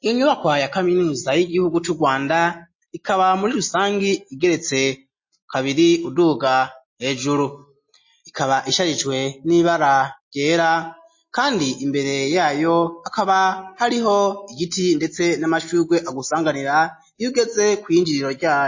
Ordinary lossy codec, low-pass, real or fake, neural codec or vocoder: MP3, 32 kbps; 7.2 kHz; real; none